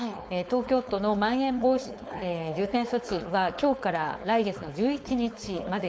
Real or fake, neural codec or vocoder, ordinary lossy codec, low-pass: fake; codec, 16 kHz, 4.8 kbps, FACodec; none; none